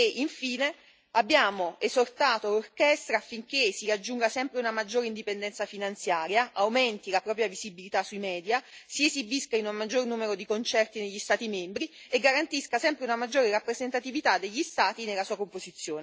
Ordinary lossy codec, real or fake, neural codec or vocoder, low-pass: none; real; none; none